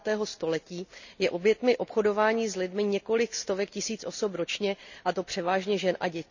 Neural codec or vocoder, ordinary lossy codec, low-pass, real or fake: none; none; 7.2 kHz; real